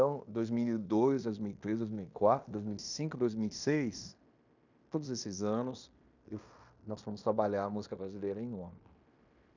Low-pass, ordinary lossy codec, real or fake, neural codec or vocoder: 7.2 kHz; none; fake; codec, 16 kHz in and 24 kHz out, 0.9 kbps, LongCat-Audio-Codec, fine tuned four codebook decoder